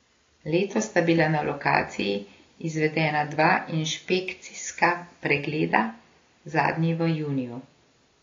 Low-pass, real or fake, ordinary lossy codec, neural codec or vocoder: 7.2 kHz; real; AAC, 32 kbps; none